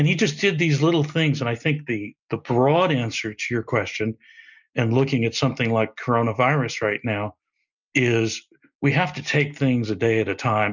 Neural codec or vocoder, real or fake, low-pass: none; real; 7.2 kHz